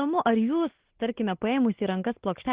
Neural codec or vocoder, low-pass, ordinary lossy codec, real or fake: none; 3.6 kHz; Opus, 16 kbps; real